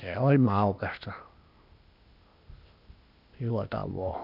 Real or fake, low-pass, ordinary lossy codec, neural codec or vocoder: fake; 5.4 kHz; none; codec, 16 kHz, 0.8 kbps, ZipCodec